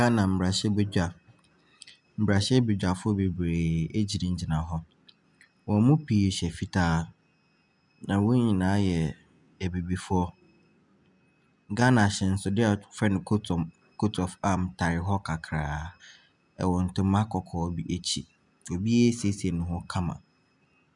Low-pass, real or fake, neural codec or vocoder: 10.8 kHz; real; none